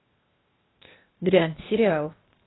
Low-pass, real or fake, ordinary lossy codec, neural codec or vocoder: 7.2 kHz; fake; AAC, 16 kbps; codec, 16 kHz, 0.8 kbps, ZipCodec